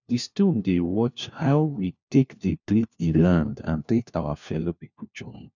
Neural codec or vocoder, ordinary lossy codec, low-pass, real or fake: codec, 16 kHz, 1 kbps, FunCodec, trained on LibriTTS, 50 frames a second; none; 7.2 kHz; fake